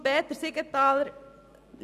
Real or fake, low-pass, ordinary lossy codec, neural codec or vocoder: real; 14.4 kHz; none; none